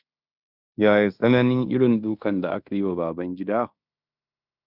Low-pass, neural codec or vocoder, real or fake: 5.4 kHz; codec, 16 kHz in and 24 kHz out, 0.9 kbps, LongCat-Audio-Codec, fine tuned four codebook decoder; fake